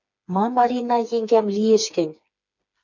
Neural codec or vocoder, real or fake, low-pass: codec, 16 kHz, 4 kbps, FreqCodec, smaller model; fake; 7.2 kHz